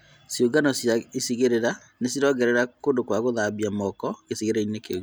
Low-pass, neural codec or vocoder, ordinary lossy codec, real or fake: none; none; none; real